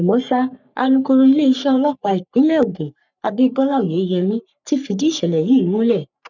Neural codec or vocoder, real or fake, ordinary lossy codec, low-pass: codec, 44.1 kHz, 3.4 kbps, Pupu-Codec; fake; none; 7.2 kHz